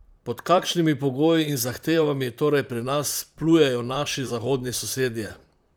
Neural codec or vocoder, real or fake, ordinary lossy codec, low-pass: vocoder, 44.1 kHz, 128 mel bands, Pupu-Vocoder; fake; none; none